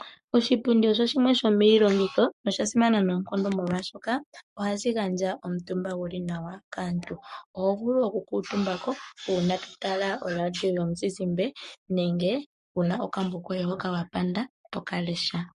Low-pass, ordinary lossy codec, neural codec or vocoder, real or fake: 14.4 kHz; MP3, 48 kbps; codec, 44.1 kHz, 7.8 kbps, Pupu-Codec; fake